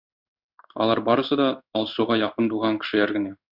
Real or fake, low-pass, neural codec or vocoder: fake; 5.4 kHz; codec, 16 kHz in and 24 kHz out, 1 kbps, XY-Tokenizer